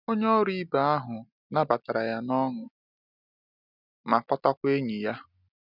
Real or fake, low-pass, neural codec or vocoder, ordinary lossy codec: real; 5.4 kHz; none; none